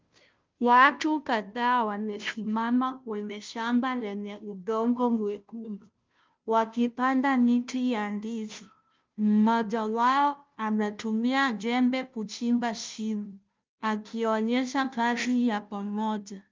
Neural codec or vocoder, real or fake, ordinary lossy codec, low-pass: codec, 16 kHz, 0.5 kbps, FunCodec, trained on Chinese and English, 25 frames a second; fake; Opus, 32 kbps; 7.2 kHz